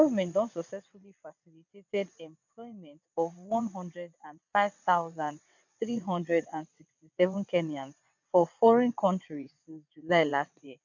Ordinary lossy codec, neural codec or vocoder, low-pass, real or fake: none; vocoder, 22.05 kHz, 80 mel bands, WaveNeXt; 7.2 kHz; fake